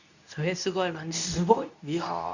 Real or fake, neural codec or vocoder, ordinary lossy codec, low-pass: fake; codec, 24 kHz, 0.9 kbps, WavTokenizer, medium speech release version 2; none; 7.2 kHz